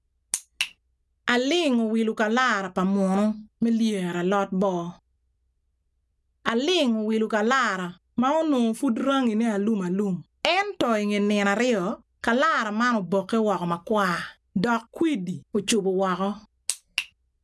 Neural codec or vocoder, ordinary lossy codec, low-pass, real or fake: none; none; none; real